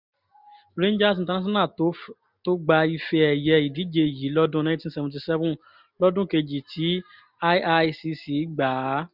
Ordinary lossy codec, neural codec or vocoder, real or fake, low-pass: none; none; real; 5.4 kHz